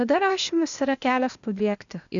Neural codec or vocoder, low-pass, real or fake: codec, 16 kHz, 0.8 kbps, ZipCodec; 7.2 kHz; fake